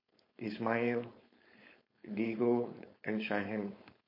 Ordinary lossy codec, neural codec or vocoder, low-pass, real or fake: MP3, 32 kbps; codec, 16 kHz, 4.8 kbps, FACodec; 5.4 kHz; fake